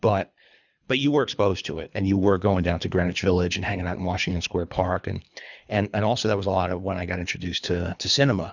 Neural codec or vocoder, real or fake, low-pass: codec, 24 kHz, 3 kbps, HILCodec; fake; 7.2 kHz